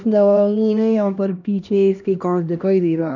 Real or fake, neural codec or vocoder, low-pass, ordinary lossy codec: fake; codec, 16 kHz, 1 kbps, X-Codec, HuBERT features, trained on LibriSpeech; 7.2 kHz; none